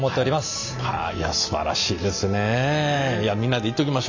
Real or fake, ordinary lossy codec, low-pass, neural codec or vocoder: real; none; 7.2 kHz; none